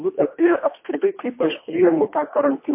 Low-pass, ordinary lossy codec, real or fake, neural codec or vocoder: 3.6 kHz; MP3, 24 kbps; fake; codec, 24 kHz, 1.5 kbps, HILCodec